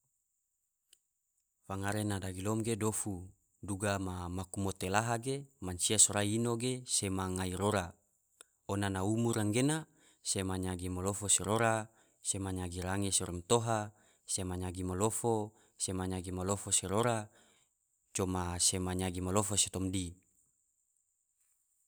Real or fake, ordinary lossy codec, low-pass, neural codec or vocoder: real; none; none; none